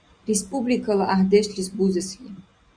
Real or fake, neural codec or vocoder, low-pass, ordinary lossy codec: real; none; 9.9 kHz; Opus, 64 kbps